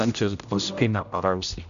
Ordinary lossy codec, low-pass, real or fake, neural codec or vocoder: none; 7.2 kHz; fake; codec, 16 kHz, 0.5 kbps, X-Codec, HuBERT features, trained on general audio